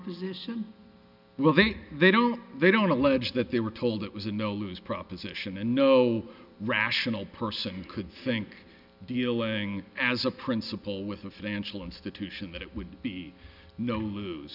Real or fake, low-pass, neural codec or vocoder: real; 5.4 kHz; none